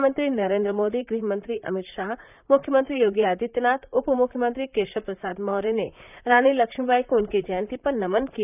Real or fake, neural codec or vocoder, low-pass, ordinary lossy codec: fake; vocoder, 44.1 kHz, 128 mel bands, Pupu-Vocoder; 3.6 kHz; none